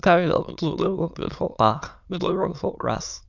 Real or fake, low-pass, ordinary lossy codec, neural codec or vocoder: fake; 7.2 kHz; none; autoencoder, 22.05 kHz, a latent of 192 numbers a frame, VITS, trained on many speakers